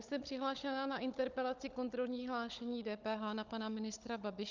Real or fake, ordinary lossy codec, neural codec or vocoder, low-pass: fake; Opus, 24 kbps; codec, 16 kHz, 16 kbps, FunCodec, trained on Chinese and English, 50 frames a second; 7.2 kHz